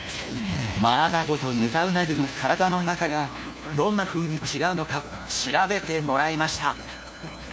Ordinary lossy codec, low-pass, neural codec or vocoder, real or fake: none; none; codec, 16 kHz, 1 kbps, FunCodec, trained on LibriTTS, 50 frames a second; fake